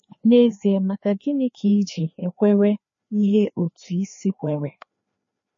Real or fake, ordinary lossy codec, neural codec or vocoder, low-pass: fake; MP3, 32 kbps; codec, 16 kHz, 2 kbps, FreqCodec, larger model; 7.2 kHz